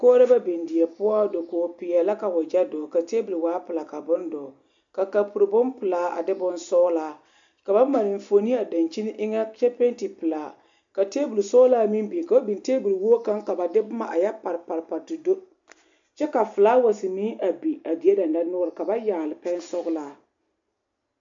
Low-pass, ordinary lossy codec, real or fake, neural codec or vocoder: 7.2 kHz; MP3, 64 kbps; real; none